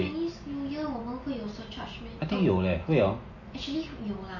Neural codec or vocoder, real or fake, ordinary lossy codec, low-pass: none; real; MP3, 32 kbps; 7.2 kHz